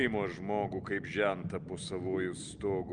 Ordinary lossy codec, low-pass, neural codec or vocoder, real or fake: Opus, 32 kbps; 9.9 kHz; none; real